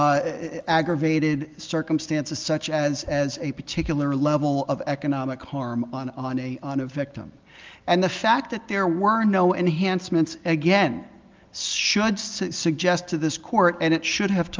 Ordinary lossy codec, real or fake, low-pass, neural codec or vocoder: Opus, 24 kbps; real; 7.2 kHz; none